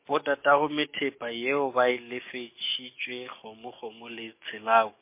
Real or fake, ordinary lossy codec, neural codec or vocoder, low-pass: fake; MP3, 24 kbps; codec, 44.1 kHz, 7.8 kbps, DAC; 3.6 kHz